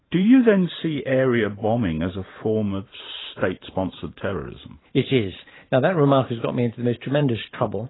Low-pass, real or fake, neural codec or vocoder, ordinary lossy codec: 7.2 kHz; fake; vocoder, 44.1 kHz, 128 mel bands every 512 samples, BigVGAN v2; AAC, 16 kbps